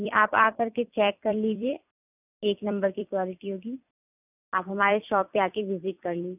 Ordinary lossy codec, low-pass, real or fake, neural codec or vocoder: none; 3.6 kHz; fake; vocoder, 44.1 kHz, 128 mel bands every 256 samples, BigVGAN v2